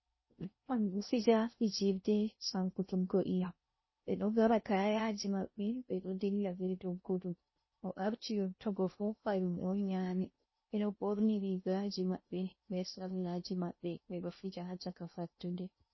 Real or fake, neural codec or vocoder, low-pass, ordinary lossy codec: fake; codec, 16 kHz in and 24 kHz out, 0.6 kbps, FocalCodec, streaming, 4096 codes; 7.2 kHz; MP3, 24 kbps